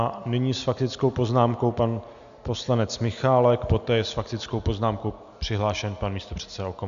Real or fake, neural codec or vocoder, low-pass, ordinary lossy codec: real; none; 7.2 kHz; AAC, 96 kbps